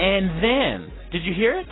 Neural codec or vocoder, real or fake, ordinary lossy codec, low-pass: none; real; AAC, 16 kbps; 7.2 kHz